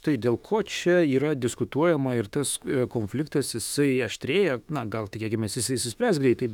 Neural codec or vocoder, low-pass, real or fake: autoencoder, 48 kHz, 32 numbers a frame, DAC-VAE, trained on Japanese speech; 19.8 kHz; fake